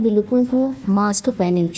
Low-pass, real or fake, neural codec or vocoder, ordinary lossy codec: none; fake; codec, 16 kHz, 1 kbps, FunCodec, trained on Chinese and English, 50 frames a second; none